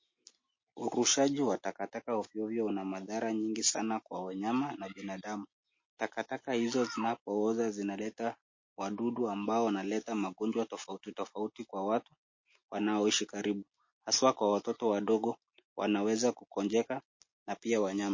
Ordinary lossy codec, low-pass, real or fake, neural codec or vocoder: MP3, 32 kbps; 7.2 kHz; real; none